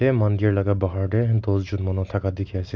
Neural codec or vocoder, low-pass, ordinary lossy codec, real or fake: none; none; none; real